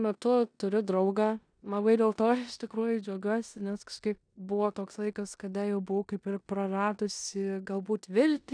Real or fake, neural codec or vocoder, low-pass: fake; codec, 16 kHz in and 24 kHz out, 0.9 kbps, LongCat-Audio-Codec, four codebook decoder; 9.9 kHz